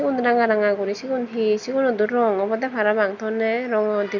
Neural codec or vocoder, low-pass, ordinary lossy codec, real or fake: none; 7.2 kHz; none; real